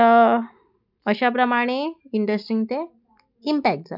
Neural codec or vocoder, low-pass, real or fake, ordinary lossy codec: none; 5.4 kHz; real; none